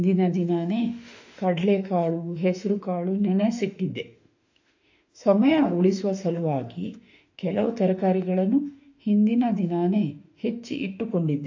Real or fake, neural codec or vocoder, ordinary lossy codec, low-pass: fake; autoencoder, 48 kHz, 32 numbers a frame, DAC-VAE, trained on Japanese speech; AAC, 48 kbps; 7.2 kHz